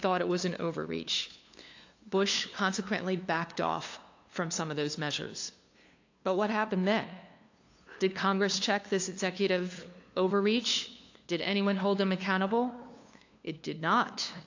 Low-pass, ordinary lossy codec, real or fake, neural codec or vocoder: 7.2 kHz; AAC, 48 kbps; fake; codec, 16 kHz, 2 kbps, FunCodec, trained on LibriTTS, 25 frames a second